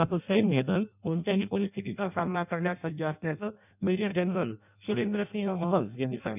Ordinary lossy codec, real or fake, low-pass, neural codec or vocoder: none; fake; 3.6 kHz; codec, 16 kHz in and 24 kHz out, 0.6 kbps, FireRedTTS-2 codec